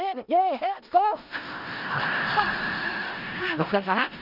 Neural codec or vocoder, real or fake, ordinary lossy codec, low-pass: codec, 16 kHz in and 24 kHz out, 0.4 kbps, LongCat-Audio-Codec, four codebook decoder; fake; none; 5.4 kHz